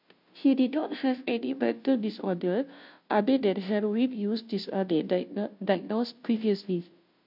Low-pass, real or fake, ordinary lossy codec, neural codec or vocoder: 5.4 kHz; fake; MP3, 48 kbps; codec, 16 kHz, 0.5 kbps, FunCodec, trained on Chinese and English, 25 frames a second